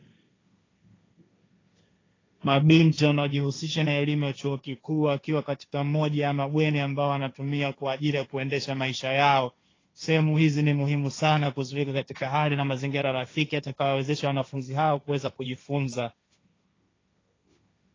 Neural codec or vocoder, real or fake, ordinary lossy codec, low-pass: codec, 16 kHz, 1.1 kbps, Voila-Tokenizer; fake; AAC, 32 kbps; 7.2 kHz